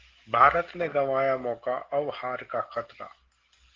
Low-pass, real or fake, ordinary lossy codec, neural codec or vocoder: 7.2 kHz; real; Opus, 16 kbps; none